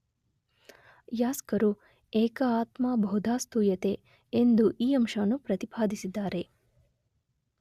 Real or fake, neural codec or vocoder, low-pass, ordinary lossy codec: real; none; 14.4 kHz; Opus, 64 kbps